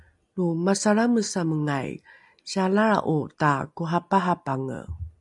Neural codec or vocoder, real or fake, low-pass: none; real; 10.8 kHz